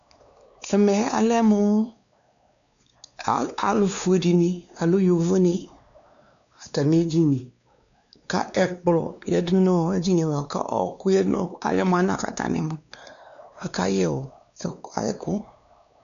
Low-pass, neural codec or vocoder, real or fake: 7.2 kHz; codec, 16 kHz, 2 kbps, X-Codec, WavLM features, trained on Multilingual LibriSpeech; fake